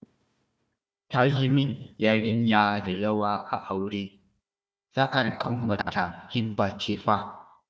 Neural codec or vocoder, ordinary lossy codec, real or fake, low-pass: codec, 16 kHz, 1 kbps, FunCodec, trained on Chinese and English, 50 frames a second; none; fake; none